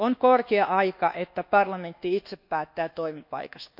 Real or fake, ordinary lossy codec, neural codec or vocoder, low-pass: fake; none; codec, 24 kHz, 1.2 kbps, DualCodec; 5.4 kHz